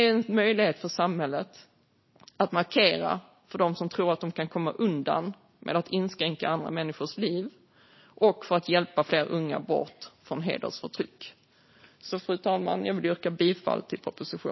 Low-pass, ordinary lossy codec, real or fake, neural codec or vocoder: 7.2 kHz; MP3, 24 kbps; real; none